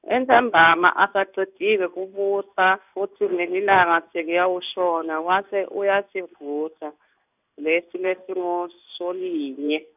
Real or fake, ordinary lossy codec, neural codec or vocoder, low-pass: fake; none; codec, 16 kHz in and 24 kHz out, 1 kbps, XY-Tokenizer; 3.6 kHz